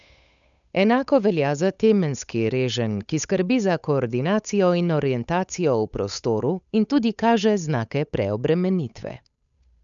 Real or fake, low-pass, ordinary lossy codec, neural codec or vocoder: fake; 7.2 kHz; none; codec, 16 kHz, 8 kbps, FunCodec, trained on Chinese and English, 25 frames a second